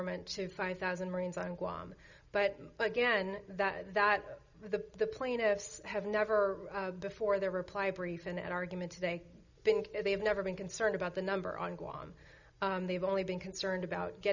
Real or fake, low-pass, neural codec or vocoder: real; 7.2 kHz; none